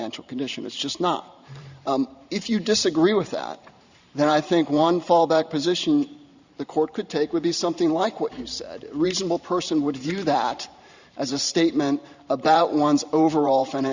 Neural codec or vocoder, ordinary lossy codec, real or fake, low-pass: vocoder, 44.1 kHz, 128 mel bands every 512 samples, BigVGAN v2; Opus, 64 kbps; fake; 7.2 kHz